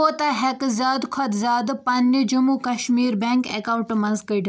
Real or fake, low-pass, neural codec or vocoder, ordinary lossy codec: real; none; none; none